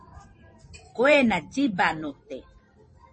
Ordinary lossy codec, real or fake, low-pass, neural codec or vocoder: MP3, 32 kbps; fake; 10.8 kHz; vocoder, 44.1 kHz, 128 mel bands every 512 samples, BigVGAN v2